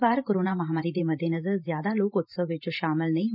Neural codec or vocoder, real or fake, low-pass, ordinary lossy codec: vocoder, 44.1 kHz, 128 mel bands every 512 samples, BigVGAN v2; fake; 5.4 kHz; none